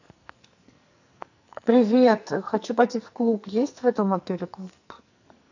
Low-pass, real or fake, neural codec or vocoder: 7.2 kHz; fake; codec, 44.1 kHz, 2.6 kbps, SNAC